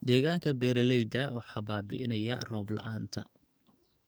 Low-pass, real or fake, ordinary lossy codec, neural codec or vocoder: none; fake; none; codec, 44.1 kHz, 2.6 kbps, SNAC